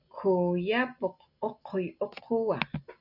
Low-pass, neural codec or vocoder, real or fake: 5.4 kHz; none; real